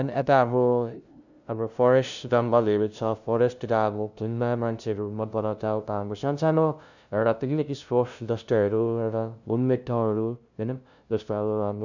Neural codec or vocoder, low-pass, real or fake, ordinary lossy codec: codec, 16 kHz, 0.5 kbps, FunCodec, trained on LibriTTS, 25 frames a second; 7.2 kHz; fake; none